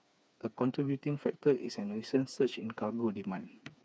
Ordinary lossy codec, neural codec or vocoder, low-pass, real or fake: none; codec, 16 kHz, 4 kbps, FreqCodec, smaller model; none; fake